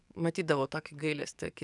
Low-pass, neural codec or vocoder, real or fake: 10.8 kHz; codec, 44.1 kHz, 7.8 kbps, DAC; fake